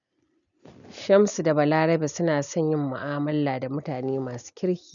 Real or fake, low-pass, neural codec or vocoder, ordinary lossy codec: real; 7.2 kHz; none; none